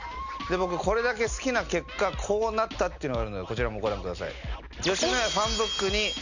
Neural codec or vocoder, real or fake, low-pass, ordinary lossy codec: none; real; 7.2 kHz; none